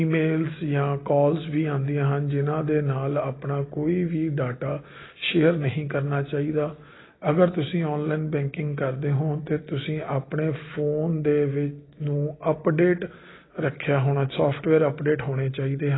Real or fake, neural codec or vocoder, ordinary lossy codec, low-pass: real; none; AAC, 16 kbps; 7.2 kHz